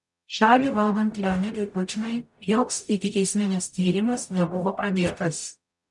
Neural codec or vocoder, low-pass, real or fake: codec, 44.1 kHz, 0.9 kbps, DAC; 10.8 kHz; fake